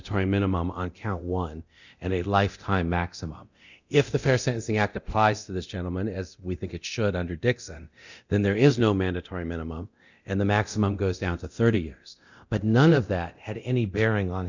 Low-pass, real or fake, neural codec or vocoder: 7.2 kHz; fake; codec, 24 kHz, 0.9 kbps, DualCodec